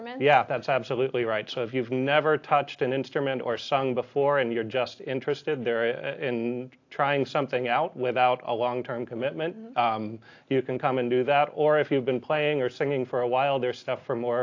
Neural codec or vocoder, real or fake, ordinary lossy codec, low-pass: none; real; AAC, 48 kbps; 7.2 kHz